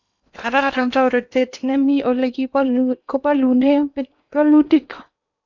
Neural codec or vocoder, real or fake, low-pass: codec, 16 kHz in and 24 kHz out, 0.8 kbps, FocalCodec, streaming, 65536 codes; fake; 7.2 kHz